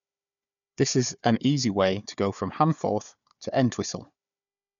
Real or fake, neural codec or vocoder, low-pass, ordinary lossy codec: fake; codec, 16 kHz, 4 kbps, FunCodec, trained on Chinese and English, 50 frames a second; 7.2 kHz; none